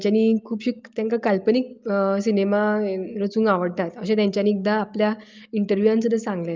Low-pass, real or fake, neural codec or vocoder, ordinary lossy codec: 7.2 kHz; real; none; Opus, 24 kbps